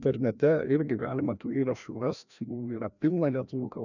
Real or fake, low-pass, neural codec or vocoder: fake; 7.2 kHz; codec, 16 kHz, 1 kbps, FreqCodec, larger model